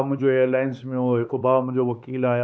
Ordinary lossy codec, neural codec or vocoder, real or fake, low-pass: none; codec, 16 kHz, 4 kbps, X-Codec, HuBERT features, trained on LibriSpeech; fake; none